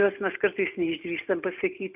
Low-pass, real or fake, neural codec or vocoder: 3.6 kHz; real; none